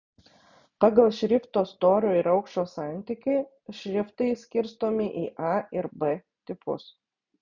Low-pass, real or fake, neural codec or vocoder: 7.2 kHz; real; none